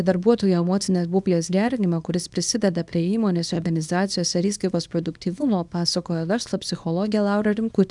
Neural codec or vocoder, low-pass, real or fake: codec, 24 kHz, 0.9 kbps, WavTokenizer, small release; 10.8 kHz; fake